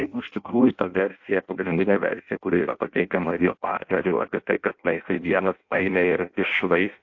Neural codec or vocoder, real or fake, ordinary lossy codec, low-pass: codec, 16 kHz in and 24 kHz out, 0.6 kbps, FireRedTTS-2 codec; fake; AAC, 48 kbps; 7.2 kHz